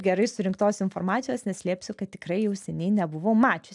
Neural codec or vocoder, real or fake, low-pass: none; real; 10.8 kHz